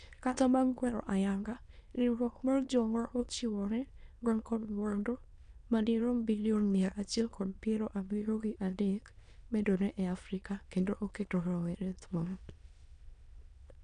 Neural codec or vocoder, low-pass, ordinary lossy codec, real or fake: autoencoder, 22.05 kHz, a latent of 192 numbers a frame, VITS, trained on many speakers; 9.9 kHz; none; fake